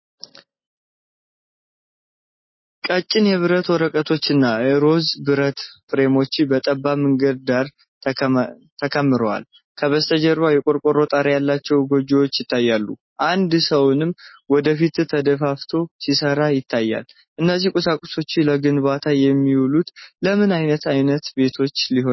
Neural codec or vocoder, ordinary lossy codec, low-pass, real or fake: none; MP3, 24 kbps; 7.2 kHz; real